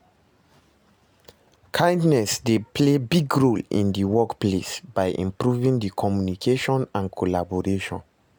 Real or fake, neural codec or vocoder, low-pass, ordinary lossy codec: real; none; none; none